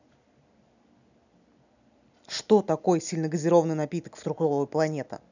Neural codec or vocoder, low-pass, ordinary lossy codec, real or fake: none; 7.2 kHz; none; real